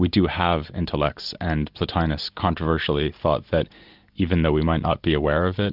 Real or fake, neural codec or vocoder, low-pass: real; none; 5.4 kHz